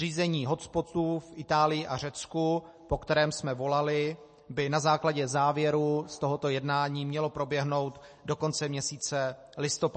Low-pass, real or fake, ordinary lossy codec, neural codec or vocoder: 10.8 kHz; real; MP3, 32 kbps; none